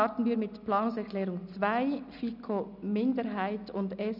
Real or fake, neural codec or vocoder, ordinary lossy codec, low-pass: real; none; none; 5.4 kHz